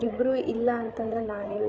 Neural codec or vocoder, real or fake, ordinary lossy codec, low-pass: codec, 16 kHz, 8 kbps, FreqCodec, larger model; fake; none; none